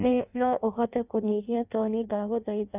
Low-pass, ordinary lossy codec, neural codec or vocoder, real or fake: 3.6 kHz; none; codec, 16 kHz in and 24 kHz out, 0.6 kbps, FireRedTTS-2 codec; fake